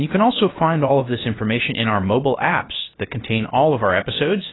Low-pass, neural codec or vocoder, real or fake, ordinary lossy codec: 7.2 kHz; codec, 16 kHz, 0.7 kbps, FocalCodec; fake; AAC, 16 kbps